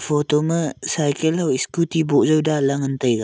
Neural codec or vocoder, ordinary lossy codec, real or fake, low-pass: none; none; real; none